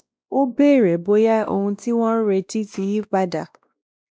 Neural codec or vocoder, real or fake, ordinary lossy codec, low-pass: codec, 16 kHz, 1 kbps, X-Codec, WavLM features, trained on Multilingual LibriSpeech; fake; none; none